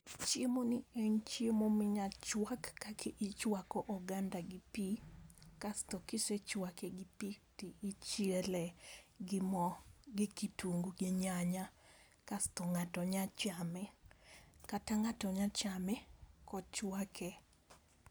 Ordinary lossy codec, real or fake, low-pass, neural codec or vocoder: none; real; none; none